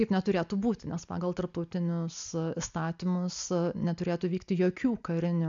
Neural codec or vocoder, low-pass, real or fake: none; 7.2 kHz; real